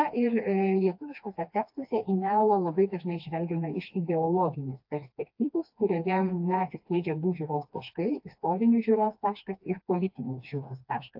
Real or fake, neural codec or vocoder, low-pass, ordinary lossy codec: fake; codec, 16 kHz, 2 kbps, FreqCodec, smaller model; 5.4 kHz; AAC, 48 kbps